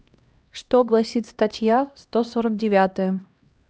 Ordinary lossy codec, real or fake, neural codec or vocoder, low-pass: none; fake; codec, 16 kHz, 1 kbps, X-Codec, HuBERT features, trained on LibriSpeech; none